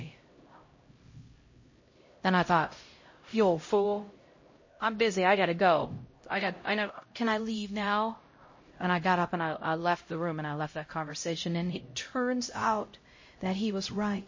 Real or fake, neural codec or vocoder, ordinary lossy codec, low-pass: fake; codec, 16 kHz, 0.5 kbps, X-Codec, HuBERT features, trained on LibriSpeech; MP3, 32 kbps; 7.2 kHz